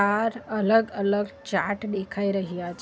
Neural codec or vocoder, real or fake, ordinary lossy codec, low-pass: none; real; none; none